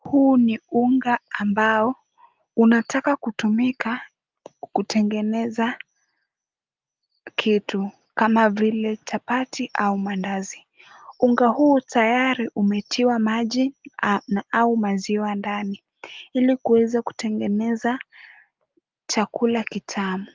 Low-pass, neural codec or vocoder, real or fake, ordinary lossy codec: 7.2 kHz; none; real; Opus, 24 kbps